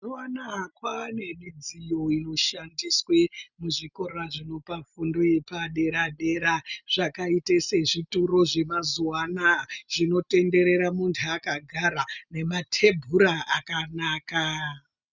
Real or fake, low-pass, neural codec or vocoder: real; 7.2 kHz; none